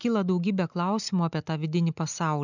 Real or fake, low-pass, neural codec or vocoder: real; 7.2 kHz; none